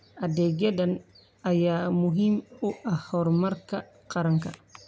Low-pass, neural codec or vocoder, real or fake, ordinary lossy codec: none; none; real; none